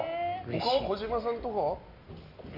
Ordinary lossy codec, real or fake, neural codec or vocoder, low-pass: none; real; none; 5.4 kHz